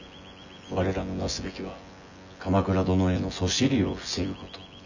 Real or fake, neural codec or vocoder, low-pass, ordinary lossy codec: fake; vocoder, 24 kHz, 100 mel bands, Vocos; 7.2 kHz; none